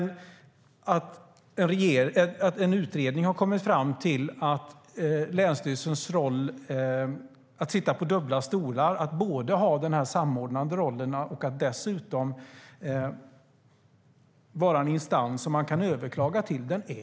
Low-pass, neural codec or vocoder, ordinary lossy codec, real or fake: none; none; none; real